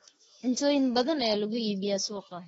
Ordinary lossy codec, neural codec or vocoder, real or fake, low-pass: AAC, 24 kbps; autoencoder, 48 kHz, 32 numbers a frame, DAC-VAE, trained on Japanese speech; fake; 19.8 kHz